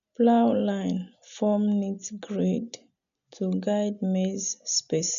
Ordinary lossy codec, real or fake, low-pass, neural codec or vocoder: none; real; 7.2 kHz; none